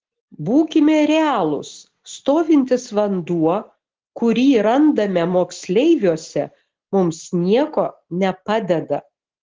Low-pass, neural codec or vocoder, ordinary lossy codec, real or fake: 7.2 kHz; none; Opus, 16 kbps; real